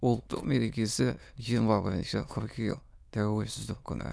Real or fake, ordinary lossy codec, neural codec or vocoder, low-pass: fake; none; autoencoder, 22.05 kHz, a latent of 192 numbers a frame, VITS, trained on many speakers; none